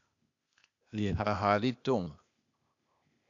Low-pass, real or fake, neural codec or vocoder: 7.2 kHz; fake; codec, 16 kHz, 0.8 kbps, ZipCodec